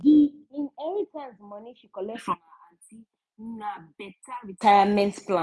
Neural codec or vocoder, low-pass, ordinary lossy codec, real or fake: none; none; none; real